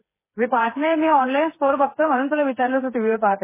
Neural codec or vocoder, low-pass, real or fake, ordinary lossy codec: codec, 16 kHz, 4 kbps, FreqCodec, smaller model; 3.6 kHz; fake; MP3, 16 kbps